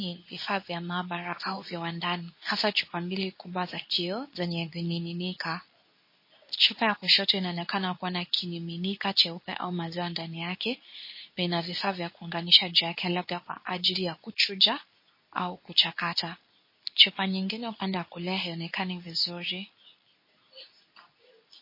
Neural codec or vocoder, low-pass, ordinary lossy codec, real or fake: codec, 24 kHz, 0.9 kbps, WavTokenizer, medium speech release version 2; 5.4 kHz; MP3, 24 kbps; fake